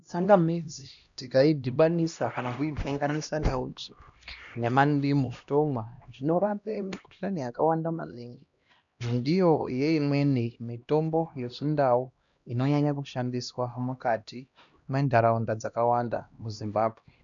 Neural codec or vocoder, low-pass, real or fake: codec, 16 kHz, 1 kbps, X-Codec, HuBERT features, trained on LibriSpeech; 7.2 kHz; fake